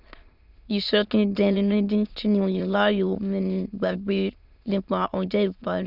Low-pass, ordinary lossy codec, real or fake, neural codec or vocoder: 5.4 kHz; Opus, 64 kbps; fake; autoencoder, 22.05 kHz, a latent of 192 numbers a frame, VITS, trained on many speakers